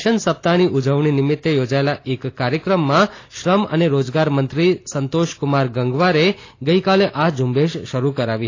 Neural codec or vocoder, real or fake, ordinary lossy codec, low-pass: none; real; AAC, 32 kbps; 7.2 kHz